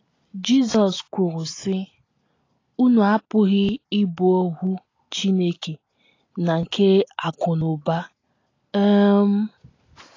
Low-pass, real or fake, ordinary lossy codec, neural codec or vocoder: 7.2 kHz; real; AAC, 32 kbps; none